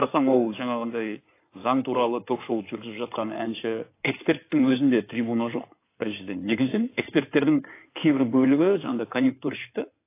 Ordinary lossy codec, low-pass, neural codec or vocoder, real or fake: AAC, 24 kbps; 3.6 kHz; codec, 16 kHz in and 24 kHz out, 2.2 kbps, FireRedTTS-2 codec; fake